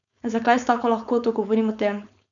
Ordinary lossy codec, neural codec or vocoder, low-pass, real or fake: none; codec, 16 kHz, 4.8 kbps, FACodec; 7.2 kHz; fake